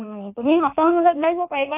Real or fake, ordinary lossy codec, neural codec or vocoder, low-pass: fake; AAC, 32 kbps; codec, 16 kHz in and 24 kHz out, 1.1 kbps, FireRedTTS-2 codec; 3.6 kHz